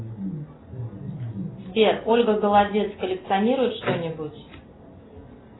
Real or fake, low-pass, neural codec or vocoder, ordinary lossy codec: real; 7.2 kHz; none; AAC, 16 kbps